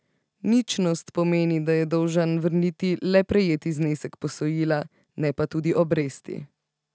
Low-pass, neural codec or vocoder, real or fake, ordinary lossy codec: none; none; real; none